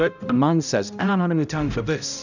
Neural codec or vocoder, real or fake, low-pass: codec, 16 kHz, 0.5 kbps, X-Codec, HuBERT features, trained on balanced general audio; fake; 7.2 kHz